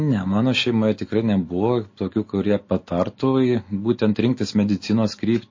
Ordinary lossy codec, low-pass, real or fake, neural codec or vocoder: MP3, 32 kbps; 7.2 kHz; real; none